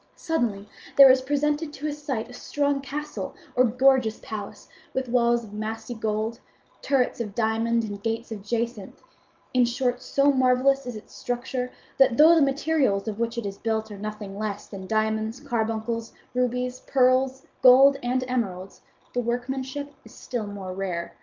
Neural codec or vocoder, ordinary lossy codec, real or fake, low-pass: none; Opus, 24 kbps; real; 7.2 kHz